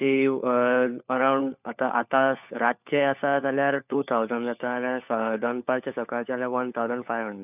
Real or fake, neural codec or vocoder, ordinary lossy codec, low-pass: fake; codec, 16 kHz, 4 kbps, FunCodec, trained on LibriTTS, 50 frames a second; none; 3.6 kHz